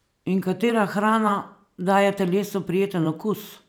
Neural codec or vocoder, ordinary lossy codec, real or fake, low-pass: vocoder, 44.1 kHz, 128 mel bands every 512 samples, BigVGAN v2; none; fake; none